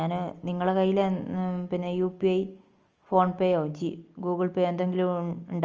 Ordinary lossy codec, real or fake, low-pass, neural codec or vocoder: Opus, 24 kbps; real; 7.2 kHz; none